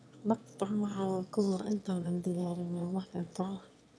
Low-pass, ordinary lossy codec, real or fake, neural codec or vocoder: none; none; fake; autoencoder, 22.05 kHz, a latent of 192 numbers a frame, VITS, trained on one speaker